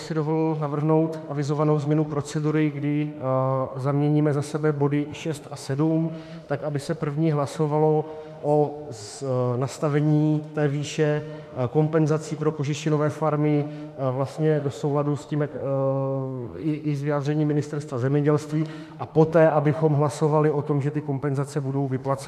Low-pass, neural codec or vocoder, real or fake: 14.4 kHz; autoencoder, 48 kHz, 32 numbers a frame, DAC-VAE, trained on Japanese speech; fake